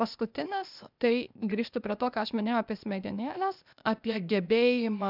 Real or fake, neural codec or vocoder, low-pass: fake; codec, 16 kHz, 0.8 kbps, ZipCodec; 5.4 kHz